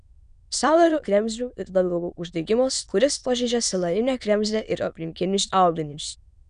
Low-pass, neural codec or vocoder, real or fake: 9.9 kHz; autoencoder, 22.05 kHz, a latent of 192 numbers a frame, VITS, trained on many speakers; fake